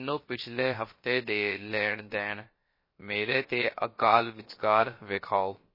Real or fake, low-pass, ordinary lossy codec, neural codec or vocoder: fake; 5.4 kHz; MP3, 24 kbps; codec, 16 kHz, about 1 kbps, DyCAST, with the encoder's durations